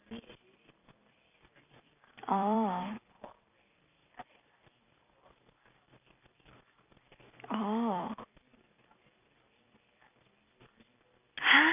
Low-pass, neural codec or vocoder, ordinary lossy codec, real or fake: 3.6 kHz; vocoder, 44.1 kHz, 128 mel bands every 256 samples, BigVGAN v2; none; fake